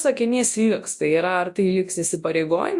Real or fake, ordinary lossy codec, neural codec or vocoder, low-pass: fake; AAC, 64 kbps; codec, 24 kHz, 0.9 kbps, WavTokenizer, large speech release; 10.8 kHz